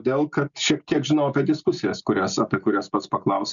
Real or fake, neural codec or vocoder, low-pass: real; none; 7.2 kHz